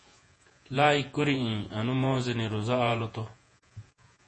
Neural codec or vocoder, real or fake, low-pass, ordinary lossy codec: vocoder, 48 kHz, 128 mel bands, Vocos; fake; 9.9 kHz; MP3, 32 kbps